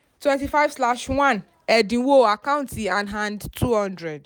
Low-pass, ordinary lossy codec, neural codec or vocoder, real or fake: none; none; none; real